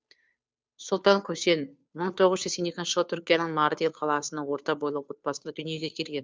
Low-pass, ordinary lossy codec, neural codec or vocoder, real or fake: none; none; codec, 16 kHz, 2 kbps, FunCodec, trained on Chinese and English, 25 frames a second; fake